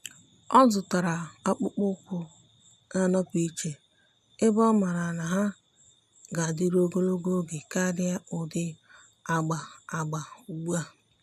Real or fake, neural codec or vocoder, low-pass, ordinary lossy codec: real; none; 14.4 kHz; none